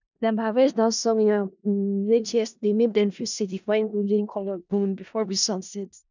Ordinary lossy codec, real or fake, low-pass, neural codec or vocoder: none; fake; 7.2 kHz; codec, 16 kHz in and 24 kHz out, 0.4 kbps, LongCat-Audio-Codec, four codebook decoder